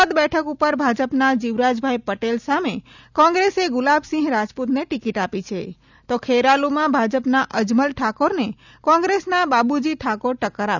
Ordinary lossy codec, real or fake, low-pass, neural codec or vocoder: none; real; 7.2 kHz; none